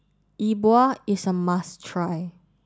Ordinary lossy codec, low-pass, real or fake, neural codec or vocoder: none; none; real; none